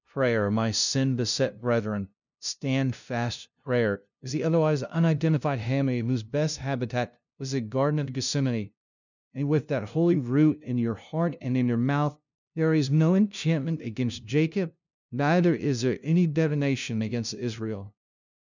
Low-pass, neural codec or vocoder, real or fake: 7.2 kHz; codec, 16 kHz, 0.5 kbps, FunCodec, trained on LibriTTS, 25 frames a second; fake